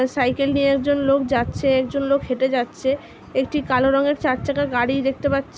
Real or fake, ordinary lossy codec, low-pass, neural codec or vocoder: real; none; none; none